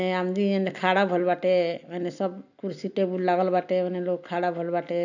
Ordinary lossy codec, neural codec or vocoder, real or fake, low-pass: none; none; real; 7.2 kHz